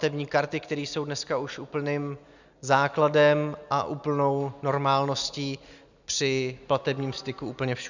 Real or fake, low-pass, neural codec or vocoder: real; 7.2 kHz; none